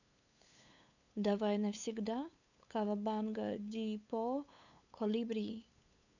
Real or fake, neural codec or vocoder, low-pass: fake; codec, 16 kHz, 8 kbps, FunCodec, trained on LibriTTS, 25 frames a second; 7.2 kHz